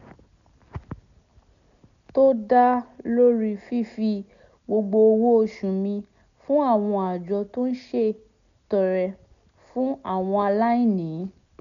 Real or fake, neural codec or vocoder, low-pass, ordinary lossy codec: real; none; 7.2 kHz; none